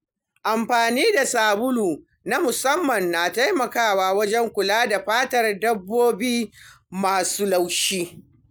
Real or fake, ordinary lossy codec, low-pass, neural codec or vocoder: real; none; none; none